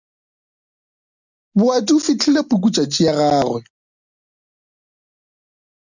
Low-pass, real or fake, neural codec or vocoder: 7.2 kHz; real; none